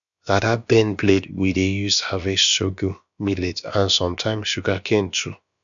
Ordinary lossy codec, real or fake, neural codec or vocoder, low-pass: none; fake; codec, 16 kHz, about 1 kbps, DyCAST, with the encoder's durations; 7.2 kHz